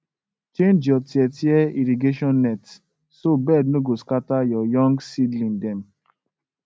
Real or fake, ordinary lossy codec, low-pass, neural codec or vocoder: real; none; none; none